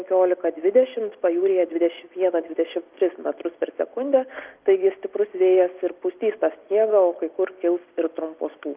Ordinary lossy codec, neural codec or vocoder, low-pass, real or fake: Opus, 32 kbps; none; 3.6 kHz; real